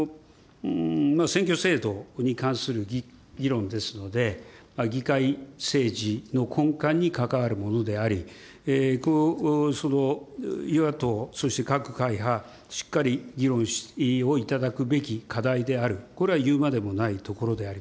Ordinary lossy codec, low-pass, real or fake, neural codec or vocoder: none; none; real; none